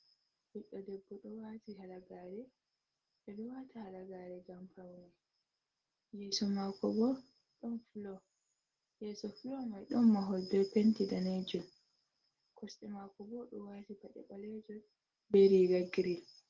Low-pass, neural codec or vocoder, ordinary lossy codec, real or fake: 7.2 kHz; none; Opus, 16 kbps; real